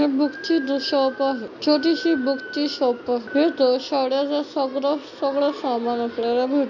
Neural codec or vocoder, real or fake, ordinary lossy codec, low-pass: none; real; none; 7.2 kHz